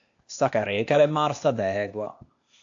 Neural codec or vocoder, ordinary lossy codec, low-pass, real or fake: codec, 16 kHz, 0.8 kbps, ZipCodec; AAC, 48 kbps; 7.2 kHz; fake